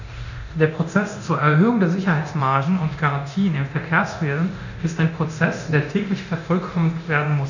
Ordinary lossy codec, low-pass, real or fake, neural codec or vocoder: none; 7.2 kHz; fake; codec, 24 kHz, 0.9 kbps, DualCodec